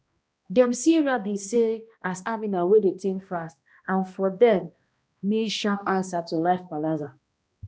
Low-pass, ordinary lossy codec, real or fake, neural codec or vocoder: none; none; fake; codec, 16 kHz, 1 kbps, X-Codec, HuBERT features, trained on balanced general audio